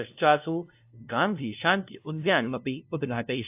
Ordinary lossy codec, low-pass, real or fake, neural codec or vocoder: AAC, 32 kbps; 3.6 kHz; fake; codec, 16 kHz, 0.5 kbps, FunCodec, trained on LibriTTS, 25 frames a second